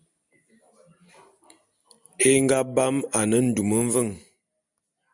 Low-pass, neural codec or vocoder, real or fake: 10.8 kHz; none; real